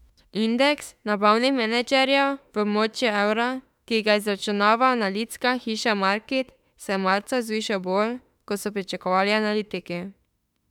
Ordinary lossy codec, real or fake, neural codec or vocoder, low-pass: none; fake; autoencoder, 48 kHz, 32 numbers a frame, DAC-VAE, trained on Japanese speech; 19.8 kHz